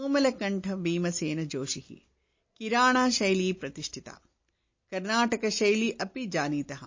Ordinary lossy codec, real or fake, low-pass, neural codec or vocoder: MP3, 32 kbps; real; 7.2 kHz; none